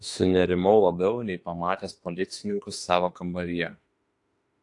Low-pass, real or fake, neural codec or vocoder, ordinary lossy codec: 10.8 kHz; fake; autoencoder, 48 kHz, 32 numbers a frame, DAC-VAE, trained on Japanese speech; AAC, 48 kbps